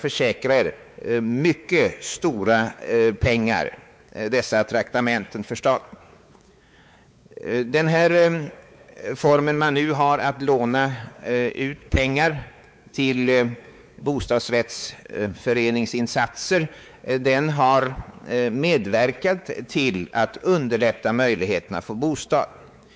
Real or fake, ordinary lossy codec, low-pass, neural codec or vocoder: fake; none; none; codec, 16 kHz, 4 kbps, X-Codec, WavLM features, trained on Multilingual LibriSpeech